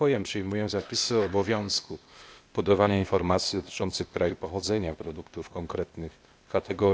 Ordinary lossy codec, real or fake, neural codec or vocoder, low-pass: none; fake; codec, 16 kHz, 0.8 kbps, ZipCodec; none